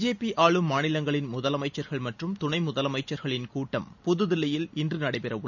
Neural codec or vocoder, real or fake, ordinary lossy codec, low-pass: none; real; none; 7.2 kHz